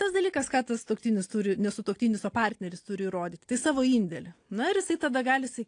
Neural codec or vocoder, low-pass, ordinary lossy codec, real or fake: none; 9.9 kHz; AAC, 48 kbps; real